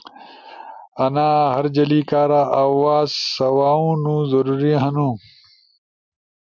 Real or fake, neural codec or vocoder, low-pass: real; none; 7.2 kHz